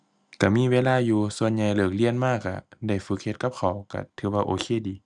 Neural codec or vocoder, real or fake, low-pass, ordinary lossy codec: none; real; none; none